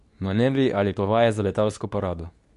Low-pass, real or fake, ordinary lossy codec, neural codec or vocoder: 10.8 kHz; fake; none; codec, 24 kHz, 0.9 kbps, WavTokenizer, medium speech release version 2